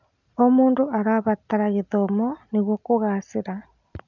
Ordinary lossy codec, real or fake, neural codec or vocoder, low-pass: none; real; none; 7.2 kHz